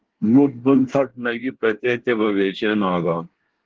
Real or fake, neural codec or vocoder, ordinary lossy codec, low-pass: fake; codec, 24 kHz, 1 kbps, SNAC; Opus, 16 kbps; 7.2 kHz